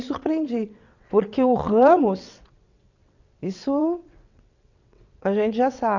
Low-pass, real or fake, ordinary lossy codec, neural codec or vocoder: 7.2 kHz; fake; none; vocoder, 22.05 kHz, 80 mel bands, WaveNeXt